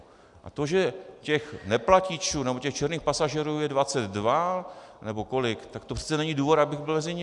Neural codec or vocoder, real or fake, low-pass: none; real; 10.8 kHz